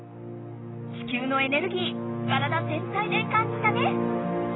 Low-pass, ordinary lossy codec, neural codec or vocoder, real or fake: 7.2 kHz; AAC, 16 kbps; none; real